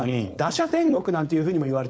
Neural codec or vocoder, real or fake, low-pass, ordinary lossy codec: codec, 16 kHz, 4.8 kbps, FACodec; fake; none; none